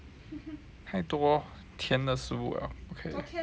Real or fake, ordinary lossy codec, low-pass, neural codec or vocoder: real; none; none; none